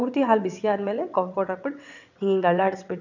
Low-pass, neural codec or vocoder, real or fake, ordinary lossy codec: 7.2 kHz; vocoder, 22.05 kHz, 80 mel bands, WaveNeXt; fake; none